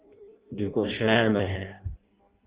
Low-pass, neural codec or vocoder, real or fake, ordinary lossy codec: 3.6 kHz; codec, 16 kHz in and 24 kHz out, 0.6 kbps, FireRedTTS-2 codec; fake; Opus, 32 kbps